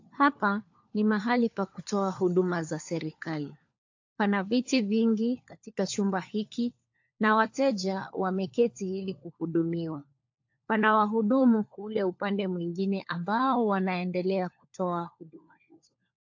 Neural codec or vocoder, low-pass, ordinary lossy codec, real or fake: codec, 16 kHz, 4 kbps, FunCodec, trained on LibriTTS, 50 frames a second; 7.2 kHz; AAC, 48 kbps; fake